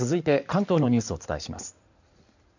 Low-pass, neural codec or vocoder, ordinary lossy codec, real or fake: 7.2 kHz; codec, 16 kHz in and 24 kHz out, 2.2 kbps, FireRedTTS-2 codec; none; fake